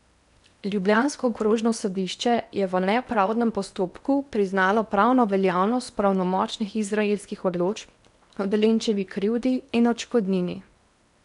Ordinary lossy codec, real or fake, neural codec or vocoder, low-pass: none; fake; codec, 16 kHz in and 24 kHz out, 0.8 kbps, FocalCodec, streaming, 65536 codes; 10.8 kHz